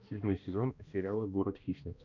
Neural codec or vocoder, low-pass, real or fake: codec, 16 kHz, 1 kbps, X-Codec, HuBERT features, trained on balanced general audio; 7.2 kHz; fake